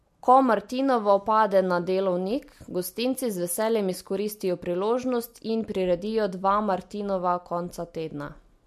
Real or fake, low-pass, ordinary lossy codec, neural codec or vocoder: real; 14.4 kHz; MP3, 64 kbps; none